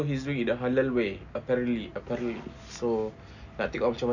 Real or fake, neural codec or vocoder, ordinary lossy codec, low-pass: real; none; none; 7.2 kHz